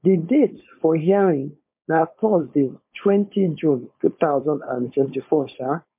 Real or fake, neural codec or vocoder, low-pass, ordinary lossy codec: fake; codec, 16 kHz, 4.8 kbps, FACodec; 3.6 kHz; MP3, 32 kbps